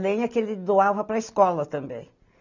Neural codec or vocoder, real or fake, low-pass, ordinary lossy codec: none; real; 7.2 kHz; none